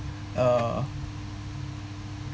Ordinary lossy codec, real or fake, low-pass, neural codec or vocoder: none; real; none; none